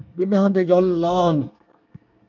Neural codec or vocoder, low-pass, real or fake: codec, 24 kHz, 1 kbps, SNAC; 7.2 kHz; fake